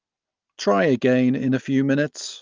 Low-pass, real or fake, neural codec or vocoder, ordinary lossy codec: 7.2 kHz; real; none; Opus, 32 kbps